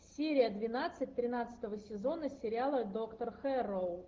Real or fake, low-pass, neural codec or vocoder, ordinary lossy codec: real; 7.2 kHz; none; Opus, 16 kbps